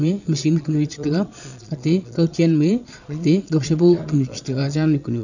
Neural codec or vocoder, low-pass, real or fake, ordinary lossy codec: vocoder, 22.05 kHz, 80 mel bands, Vocos; 7.2 kHz; fake; none